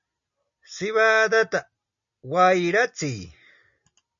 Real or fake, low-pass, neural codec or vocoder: real; 7.2 kHz; none